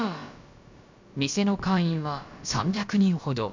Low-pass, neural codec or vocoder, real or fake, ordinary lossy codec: 7.2 kHz; codec, 16 kHz, about 1 kbps, DyCAST, with the encoder's durations; fake; MP3, 64 kbps